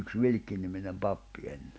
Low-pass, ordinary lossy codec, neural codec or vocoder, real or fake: none; none; none; real